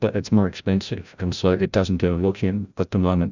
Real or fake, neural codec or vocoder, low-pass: fake; codec, 16 kHz, 0.5 kbps, FreqCodec, larger model; 7.2 kHz